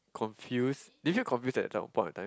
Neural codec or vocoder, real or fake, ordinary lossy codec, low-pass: none; real; none; none